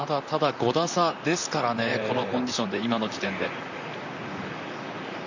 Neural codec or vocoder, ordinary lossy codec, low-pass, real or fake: vocoder, 44.1 kHz, 128 mel bands, Pupu-Vocoder; none; 7.2 kHz; fake